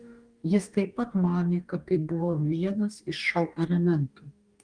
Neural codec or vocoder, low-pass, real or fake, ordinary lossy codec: codec, 44.1 kHz, 2.6 kbps, DAC; 9.9 kHz; fake; Opus, 32 kbps